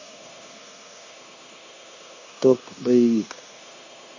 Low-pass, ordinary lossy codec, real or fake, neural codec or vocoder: 7.2 kHz; MP3, 32 kbps; fake; codec, 16 kHz, 0.9 kbps, LongCat-Audio-Codec